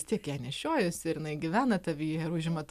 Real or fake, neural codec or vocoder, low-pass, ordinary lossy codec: real; none; 14.4 kHz; AAC, 96 kbps